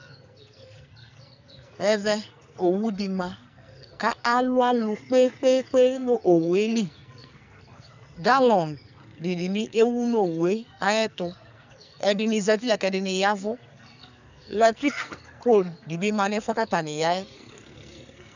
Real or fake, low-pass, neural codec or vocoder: fake; 7.2 kHz; codec, 44.1 kHz, 2.6 kbps, SNAC